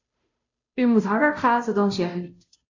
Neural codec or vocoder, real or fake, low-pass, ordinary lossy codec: codec, 16 kHz, 0.5 kbps, FunCodec, trained on Chinese and English, 25 frames a second; fake; 7.2 kHz; AAC, 48 kbps